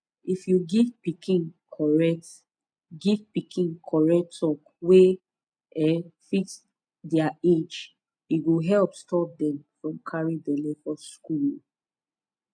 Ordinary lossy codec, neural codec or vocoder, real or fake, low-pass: MP3, 96 kbps; none; real; 9.9 kHz